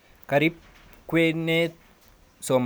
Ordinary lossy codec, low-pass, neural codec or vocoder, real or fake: none; none; none; real